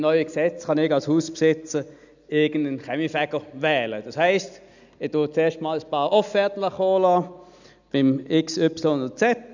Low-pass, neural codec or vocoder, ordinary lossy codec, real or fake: 7.2 kHz; none; none; real